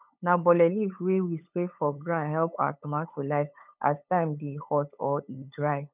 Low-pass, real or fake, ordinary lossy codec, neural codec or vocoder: 3.6 kHz; fake; none; codec, 16 kHz, 8 kbps, FunCodec, trained on LibriTTS, 25 frames a second